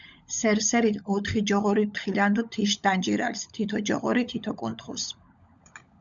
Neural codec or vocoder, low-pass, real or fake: codec, 16 kHz, 16 kbps, FunCodec, trained on LibriTTS, 50 frames a second; 7.2 kHz; fake